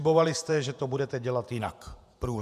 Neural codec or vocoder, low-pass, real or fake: vocoder, 48 kHz, 128 mel bands, Vocos; 14.4 kHz; fake